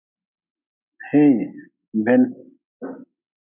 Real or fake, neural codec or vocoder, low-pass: real; none; 3.6 kHz